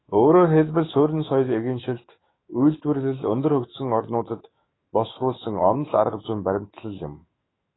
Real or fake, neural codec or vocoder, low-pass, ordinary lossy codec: fake; autoencoder, 48 kHz, 128 numbers a frame, DAC-VAE, trained on Japanese speech; 7.2 kHz; AAC, 16 kbps